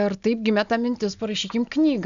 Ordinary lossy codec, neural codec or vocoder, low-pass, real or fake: Opus, 64 kbps; none; 7.2 kHz; real